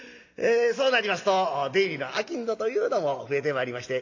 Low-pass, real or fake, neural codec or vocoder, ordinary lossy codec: 7.2 kHz; real; none; AAC, 48 kbps